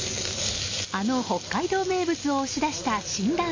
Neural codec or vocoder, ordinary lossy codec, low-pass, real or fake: none; MP3, 32 kbps; 7.2 kHz; real